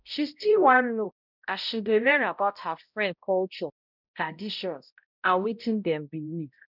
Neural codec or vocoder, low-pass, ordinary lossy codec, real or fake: codec, 16 kHz, 0.5 kbps, X-Codec, HuBERT features, trained on balanced general audio; 5.4 kHz; none; fake